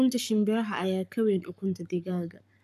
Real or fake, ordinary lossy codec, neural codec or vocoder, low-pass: fake; AAC, 96 kbps; codec, 44.1 kHz, 7.8 kbps, Pupu-Codec; 14.4 kHz